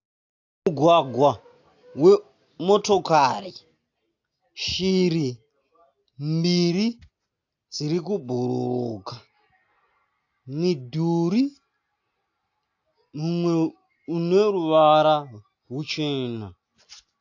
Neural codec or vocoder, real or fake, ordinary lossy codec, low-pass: none; real; AAC, 48 kbps; 7.2 kHz